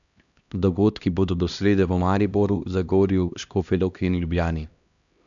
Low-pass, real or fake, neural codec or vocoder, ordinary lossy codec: 7.2 kHz; fake; codec, 16 kHz, 1 kbps, X-Codec, HuBERT features, trained on LibriSpeech; none